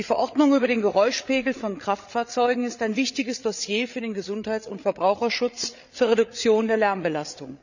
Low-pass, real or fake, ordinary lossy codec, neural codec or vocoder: 7.2 kHz; fake; none; codec, 16 kHz, 8 kbps, FreqCodec, larger model